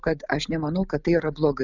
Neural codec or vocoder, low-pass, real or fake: none; 7.2 kHz; real